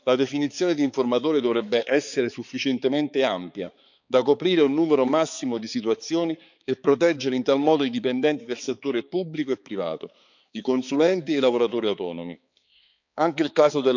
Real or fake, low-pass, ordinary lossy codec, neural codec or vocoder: fake; 7.2 kHz; none; codec, 16 kHz, 4 kbps, X-Codec, HuBERT features, trained on balanced general audio